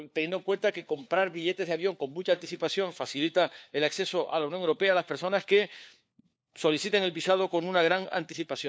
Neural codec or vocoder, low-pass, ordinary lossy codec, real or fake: codec, 16 kHz, 4 kbps, FunCodec, trained on LibriTTS, 50 frames a second; none; none; fake